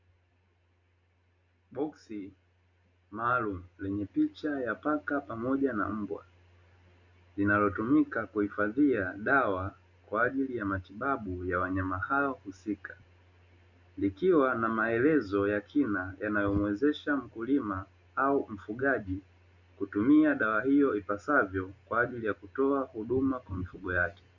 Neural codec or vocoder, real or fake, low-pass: none; real; 7.2 kHz